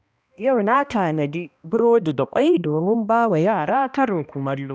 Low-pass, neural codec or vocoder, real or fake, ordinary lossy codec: none; codec, 16 kHz, 1 kbps, X-Codec, HuBERT features, trained on balanced general audio; fake; none